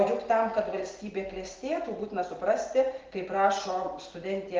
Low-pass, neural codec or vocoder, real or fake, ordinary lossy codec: 7.2 kHz; none; real; Opus, 16 kbps